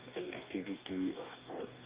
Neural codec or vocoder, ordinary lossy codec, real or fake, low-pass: codec, 44.1 kHz, 2.6 kbps, DAC; Opus, 32 kbps; fake; 3.6 kHz